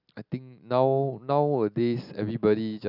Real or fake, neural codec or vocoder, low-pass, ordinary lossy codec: real; none; 5.4 kHz; none